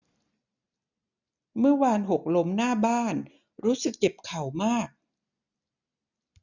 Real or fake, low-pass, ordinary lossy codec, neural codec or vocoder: real; 7.2 kHz; none; none